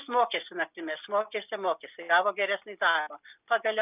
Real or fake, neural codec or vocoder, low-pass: real; none; 3.6 kHz